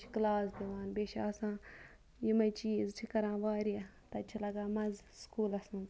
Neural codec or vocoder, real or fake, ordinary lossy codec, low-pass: none; real; none; none